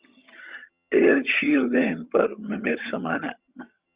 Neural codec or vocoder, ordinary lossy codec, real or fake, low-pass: vocoder, 22.05 kHz, 80 mel bands, HiFi-GAN; Opus, 64 kbps; fake; 3.6 kHz